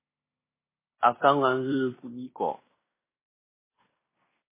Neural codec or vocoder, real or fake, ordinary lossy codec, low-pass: codec, 16 kHz in and 24 kHz out, 0.9 kbps, LongCat-Audio-Codec, fine tuned four codebook decoder; fake; MP3, 16 kbps; 3.6 kHz